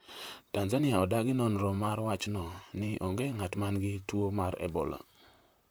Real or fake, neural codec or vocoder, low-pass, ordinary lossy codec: fake; vocoder, 44.1 kHz, 128 mel bands, Pupu-Vocoder; none; none